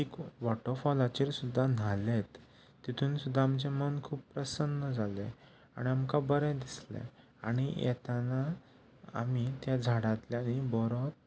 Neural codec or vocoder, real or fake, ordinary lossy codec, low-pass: none; real; none; none